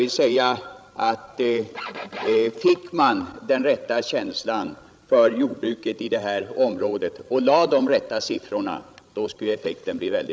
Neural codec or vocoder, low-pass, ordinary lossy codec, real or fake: codec, 16 kHz, 16 kbps, FreqCodec, larger model; none; none; fake